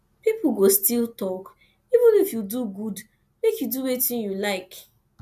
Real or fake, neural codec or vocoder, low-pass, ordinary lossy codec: real; none; 14.4 kHz; none